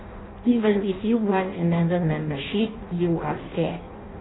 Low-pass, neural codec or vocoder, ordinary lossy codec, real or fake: 7.2 kHz; codec, 16 kHz in and 24 kHz out, 0.6 kbps, FireRedTTS-2 codec; AAC, 16 kbps; fake